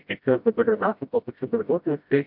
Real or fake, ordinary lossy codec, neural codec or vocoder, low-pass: fake; MP3, 48 kbps; codec, 16 kHz, 0.5 kbps, FreqCodec, smaller model; 5.4 kHz